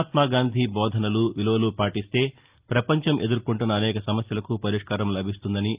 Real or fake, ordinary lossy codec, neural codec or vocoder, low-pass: real; Opus, 32 kbps; none; 3.6 kHz